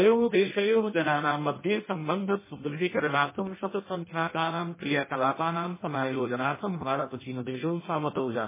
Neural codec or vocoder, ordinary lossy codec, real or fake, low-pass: codec, 16 kHz in and 24 kHz out, 0.6 kbps, FireRedTTS-2 codec; MP3, 16 kbps; fake; 3.6 kHz